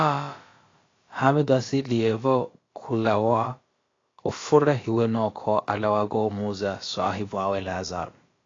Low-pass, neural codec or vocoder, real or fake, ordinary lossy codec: 7.2 kHz; codec, 16 kHz, about 1 kbps, DyCAST, with the encoder's durations; fake; AAC, 32 kbps